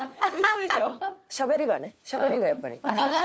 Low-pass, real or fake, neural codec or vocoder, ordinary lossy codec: none; fake; codec, 16 kHz, 4 kbps, FunCodec, trained on LibriTTS, 50 frames a second; none